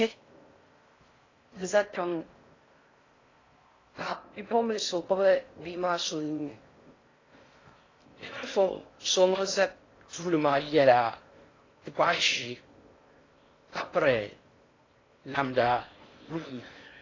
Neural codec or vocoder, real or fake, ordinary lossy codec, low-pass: codec, 16 kHz in and 24 kHz out, 0.6 kbps, FocalCodec, streaming, 4096 codes; fake; AAC, 32 kbps; 7.2 kHz